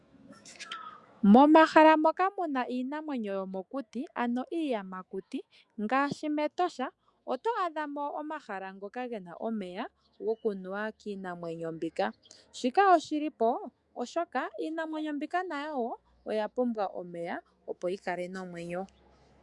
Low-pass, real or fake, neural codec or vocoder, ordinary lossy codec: 10.8 kHz; fake; autoencoder, 48 kHz, 128 numbers a frame, DAC-VAE, trained on Japanese speech; Opus, 64 kbps